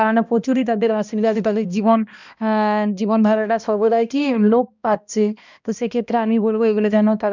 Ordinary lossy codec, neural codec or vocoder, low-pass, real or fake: none; codec, 16 kHz, 1 kbps, X-Codec, HuBERT features, trained on balanced general audio; 7.2 kHz; fake